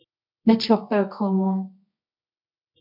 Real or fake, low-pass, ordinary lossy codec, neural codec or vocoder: fake; 5.4 kHz; MP3, 48 kbps; codec, 24 kHz, 0.9 kbps, WavTokenizer, medium music audio release